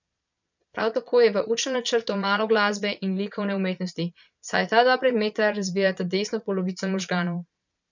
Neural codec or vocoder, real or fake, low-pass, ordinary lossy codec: vocoder, 22.05 kHz, 80 mel bands, Vocos; fake; 7.2 kHz; none